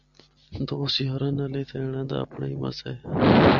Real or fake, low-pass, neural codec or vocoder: real; 7.2 kHz; none